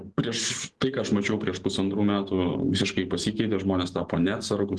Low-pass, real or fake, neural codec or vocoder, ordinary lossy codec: 10.8 kHz; fake; vocoder, 44.1 kHz, 128 mel bands every 512 samples, BigVGAN v2; Opus, 16 kbps